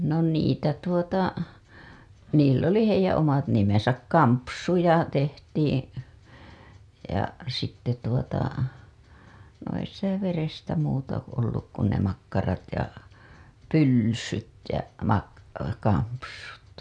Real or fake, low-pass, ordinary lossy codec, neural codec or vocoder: real; none; none; none